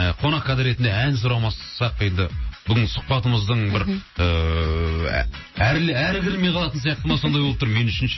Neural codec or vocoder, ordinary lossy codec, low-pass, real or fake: none; MP3, 24 kbps; 7.2 kHz; real